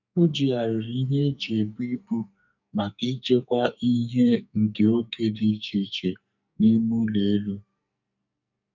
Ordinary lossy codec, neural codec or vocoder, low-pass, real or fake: none; codec, 44.1 kHz, 2.6 kbps, SNAC; 7.2 kHz; fake